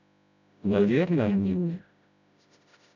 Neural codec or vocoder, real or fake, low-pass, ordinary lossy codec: codec, 16 kHz, 0.5 kbps, FreqCodec, smaller model; fake; 7.2 kHz; none